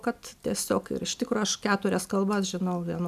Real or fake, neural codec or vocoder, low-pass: real; none; 14.4 kHz